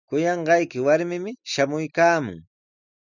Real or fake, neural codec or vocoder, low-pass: real; none; 7.2 kHz